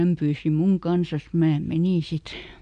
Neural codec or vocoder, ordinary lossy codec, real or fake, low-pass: none; none; real; 9.9 kHz